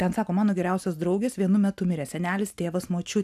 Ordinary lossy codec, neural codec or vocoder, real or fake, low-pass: AAC, 96 kbps; none; real; 14.4 kHz